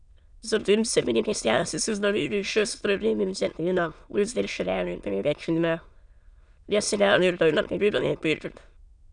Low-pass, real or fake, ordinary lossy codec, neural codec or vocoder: 9.9 kHz; fake; none; autoencoder, 22.05 kHz, a latent of 192 numbers a frame, VITS, trained on many speakers